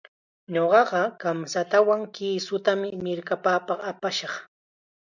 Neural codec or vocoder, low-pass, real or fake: none; 7.2 kHz; real